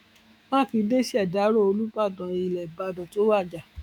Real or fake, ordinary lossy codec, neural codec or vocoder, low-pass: fake; none; autoencoder, 48 kHz, 128 numbers a frame, DAC-VAE, trained on Japanese speech; 19.8 kHz